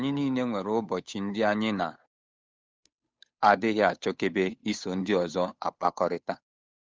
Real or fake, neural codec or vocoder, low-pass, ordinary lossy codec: fake; codec, 16 kHz, 8 kbps, FunCodec, trained on Chinese and English, 25 frames a second; none; none